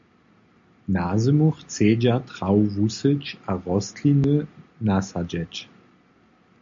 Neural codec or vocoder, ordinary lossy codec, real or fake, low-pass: none; MP3, 48 kbps; real; 7.2 kHz